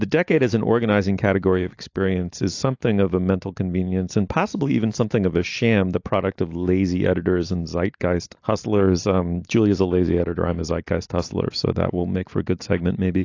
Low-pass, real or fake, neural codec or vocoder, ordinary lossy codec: 7.2 kHz; real; none; AAC, 48 kbps